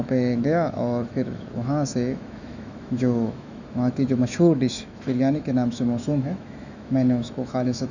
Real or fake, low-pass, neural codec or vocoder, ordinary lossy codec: fake; 7.2 kHz; autoencoder, 48 kHz, 128 numbers a frame, DAC-VAE, trained on Japanese speech; none